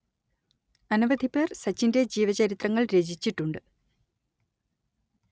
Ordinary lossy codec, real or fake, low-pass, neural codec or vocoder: none; real; none; none